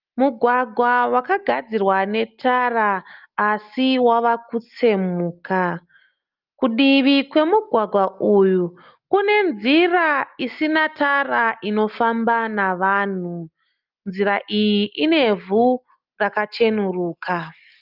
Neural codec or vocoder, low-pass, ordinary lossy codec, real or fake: none; 5.4 kHz; Opus, 32 kbps; real